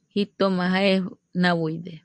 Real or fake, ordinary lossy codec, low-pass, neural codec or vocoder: real; MP3, 64 kbps; 9.9 kHz; none